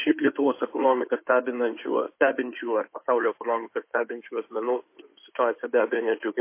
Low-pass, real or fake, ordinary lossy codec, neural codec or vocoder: 3.6 kHz; fake; MP3, 24 kbps; codec, 16 kHz in and 24 kHz out, 2.2 kbps, FireRedTTS-2 codec